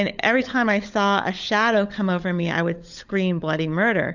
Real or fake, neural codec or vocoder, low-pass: fake; codec, 16 kHz, 16 kbps, FunCodec, trained on LibriTTS, 50 frames a second; 7.2 kHz